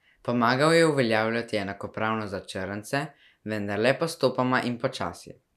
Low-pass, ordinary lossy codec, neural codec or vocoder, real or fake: 14.4 kHz; none; none; real